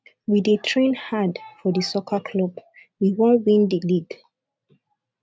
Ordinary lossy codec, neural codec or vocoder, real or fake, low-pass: none; none; real; none